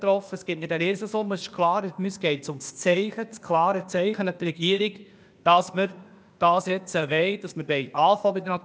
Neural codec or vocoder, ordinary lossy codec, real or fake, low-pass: codec, 16 kHz, 0.8 kbps, ZipCodec; none; fake; none